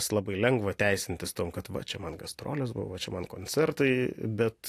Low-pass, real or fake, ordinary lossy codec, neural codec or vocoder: 14.4 kHz; real; AAC, 64 kbps; none